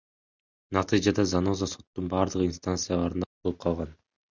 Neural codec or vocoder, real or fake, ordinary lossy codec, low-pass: none; real; Opus, 64 kbps; 7.2 kHz